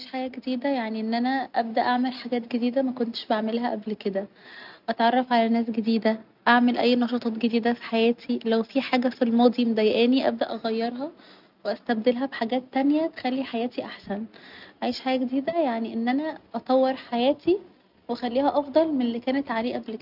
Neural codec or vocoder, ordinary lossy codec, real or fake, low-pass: none; none; real; 5.4 kHz